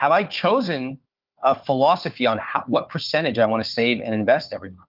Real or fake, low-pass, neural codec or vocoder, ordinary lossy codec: fake; 5.4 kHz; codec, 16 kHz, 4 kbps, FunCodec, trained on Chinese and English, 50 frames a second; Opus, 24 kbps